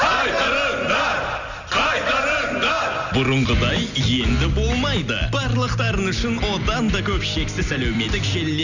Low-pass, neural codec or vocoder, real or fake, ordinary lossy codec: 7.2 kHz; none; real; none